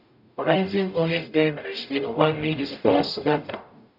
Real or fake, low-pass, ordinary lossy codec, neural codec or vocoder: fake; 5.4 kHz; none; codec, 44.1 kHz, 0.9 kbps, DAC